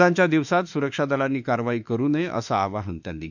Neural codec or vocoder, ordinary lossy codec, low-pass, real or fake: autoencoder, 48 kHz, 32 numbers a frame, DAC-VAE, trained on Japanese speech; none; 7.2 kHz; fake